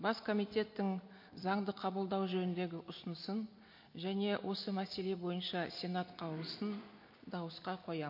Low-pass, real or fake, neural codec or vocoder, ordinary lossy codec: 5.4 kHz; real; none; MP3, 32 kbps